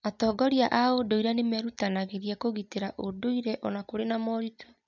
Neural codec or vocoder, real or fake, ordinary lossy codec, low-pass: none; real; none; 7.2 kHz